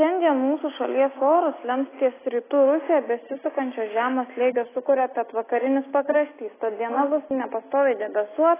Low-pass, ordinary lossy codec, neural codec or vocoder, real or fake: 3.6 kHz; AAC, 16 kbps; none; real